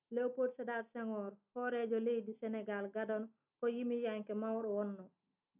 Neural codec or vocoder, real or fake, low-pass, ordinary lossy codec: none; real; 3.6 kHz; none